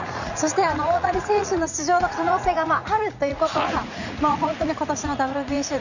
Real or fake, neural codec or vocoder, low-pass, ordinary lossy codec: fake; vocoder, 44.1 kHz, 80 mel bands, Vocos; 7.2 kHz; none